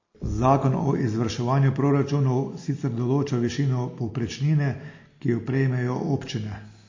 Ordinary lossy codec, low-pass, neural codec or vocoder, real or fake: MP3, 32 kbps; 7.2 kHz; none; real